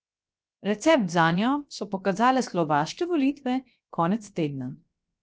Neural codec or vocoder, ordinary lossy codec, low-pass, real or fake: codec, 16 kHz, 0.7 kbps, FocalCodec; none; none; fake